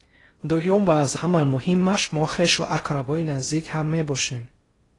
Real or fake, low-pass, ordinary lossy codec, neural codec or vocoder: fake; 10.8 kHz; AAC, 32 kbps; codec, 16 kHz in and 24 kHz out, 0.6 kbps, FocalCodec, streaming, 4096 codes